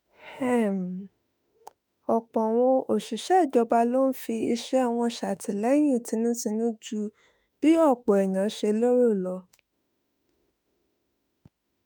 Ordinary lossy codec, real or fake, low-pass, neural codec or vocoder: none; fake; none; autoencoder, 48 kHz, 32 numbers a frame, DAC-VAE, trained on Japanese speech